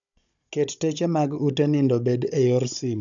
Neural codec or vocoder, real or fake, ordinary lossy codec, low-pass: codec, 16 kHz, 16 kbps, FunCodec, trained on Chinese and English, 50 frames a second; fake; none; 7.2 kHz